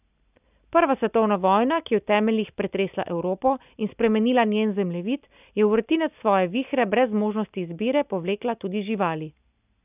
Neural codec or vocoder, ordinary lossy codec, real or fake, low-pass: none; none; real; 3.6 kHz